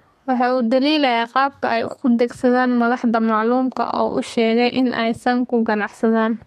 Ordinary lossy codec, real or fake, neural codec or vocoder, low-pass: MP3, 64 kbps; fake; codec, 32 kHz, 1.9 kbps, SNAC; 14.4 kHz